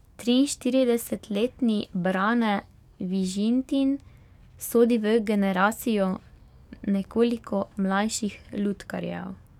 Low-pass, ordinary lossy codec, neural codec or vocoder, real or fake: 19.8 kHz; none; codec, 44.1 kHz, 7.8 kbps, DAC; fake